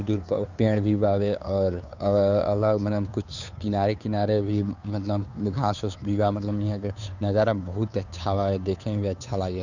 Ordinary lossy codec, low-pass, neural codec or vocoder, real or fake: none; 7.2 kHz; codec, 16 kHz, 2 kbps, FunCodec, trained on Chinese and English, 25 frames a second; fake